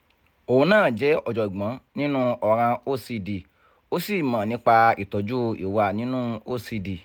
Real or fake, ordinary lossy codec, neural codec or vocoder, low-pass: real; none; none; none